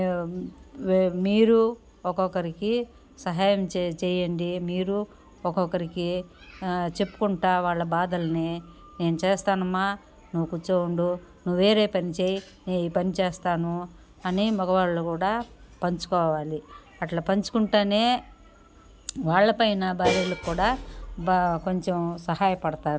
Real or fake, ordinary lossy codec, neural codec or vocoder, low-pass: real; none; none; none